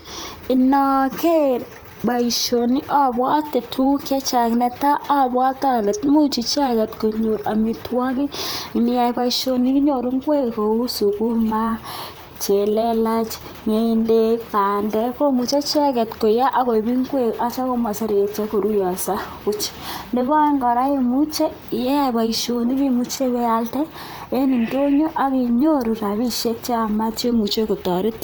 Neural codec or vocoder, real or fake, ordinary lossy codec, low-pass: vocoder, 44.1 kHz, 128 mel bands, Pupu-Vocoder; fake; none; none